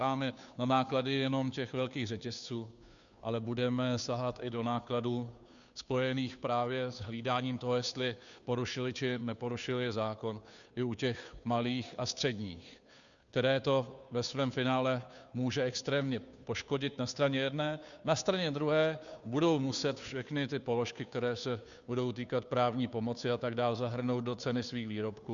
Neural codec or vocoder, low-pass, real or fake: codec, 16 kHz, 2 kbps, FunCodec, trained on Chinese and English, 25 frames a second; 7.2 kHz; fake